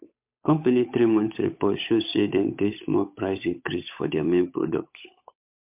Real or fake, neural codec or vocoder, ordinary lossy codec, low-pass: fake; codec, 16 kHz, 8 kbps, FunCodec, trained on Chinese and English, 25 frames a second; MP3, 32 kbps; 3.6 kHz